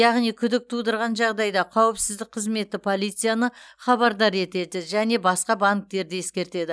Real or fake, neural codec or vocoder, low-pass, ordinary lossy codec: real; none; none; none